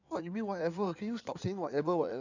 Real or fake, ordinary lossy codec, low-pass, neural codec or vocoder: fake; none; 7.2 kHz; codec, 16 kHz in and 24 kHz out, 2.2 kbps, FireRedTTS-2 codec